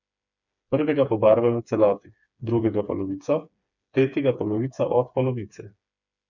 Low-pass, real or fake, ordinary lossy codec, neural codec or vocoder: 7.2 kHz; fake; none; codec, 16 kHz, 4 kbps, FreqCodec, smaller model